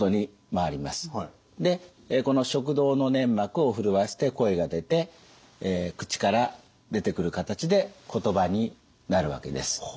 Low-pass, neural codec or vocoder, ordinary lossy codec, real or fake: none; none; none; real